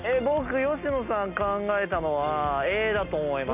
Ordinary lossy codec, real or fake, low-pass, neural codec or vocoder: none; real; 3.6 kHz; none